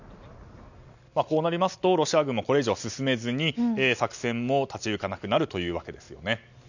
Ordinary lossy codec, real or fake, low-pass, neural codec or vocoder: none; real; 7.2 kHz; none